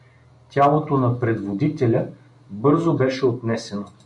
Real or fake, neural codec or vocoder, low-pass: real; none; 10.8 kHz